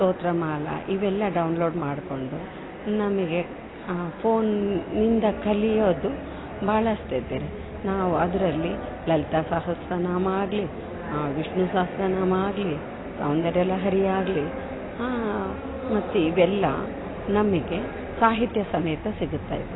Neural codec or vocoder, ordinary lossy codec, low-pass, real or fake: none; AAC, 16 kbps; 7.2 kHz; real